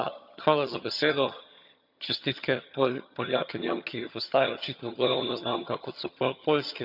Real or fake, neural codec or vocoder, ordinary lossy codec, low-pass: fake; vocoder, 22.05 kHz, 80 mel bands, HiFi-GAN; none; 5.4 kHz